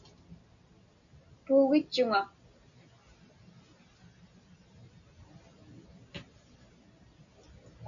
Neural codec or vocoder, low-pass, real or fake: none; 7.2 kHz; real